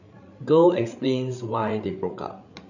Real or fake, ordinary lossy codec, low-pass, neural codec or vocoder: fake; none; 7.2 kHz; codec, 16 kHz, 8 kbps, FreqCodec, larger model